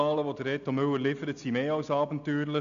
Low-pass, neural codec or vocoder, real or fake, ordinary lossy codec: 7.2 kHz; none; real; none